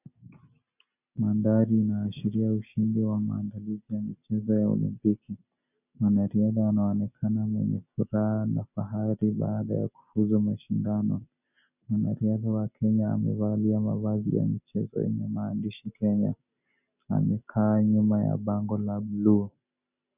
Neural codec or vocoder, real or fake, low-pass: none; real; 3.6 kHz